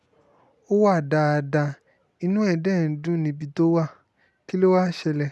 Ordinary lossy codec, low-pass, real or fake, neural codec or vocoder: none; none; real; none